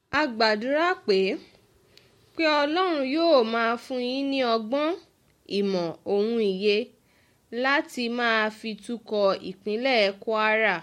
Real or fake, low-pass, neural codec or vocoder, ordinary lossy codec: real; 19.8 kHz; none; MP3, 64 kbps